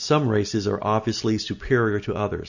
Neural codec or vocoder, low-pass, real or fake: none; 7.2 kHz; real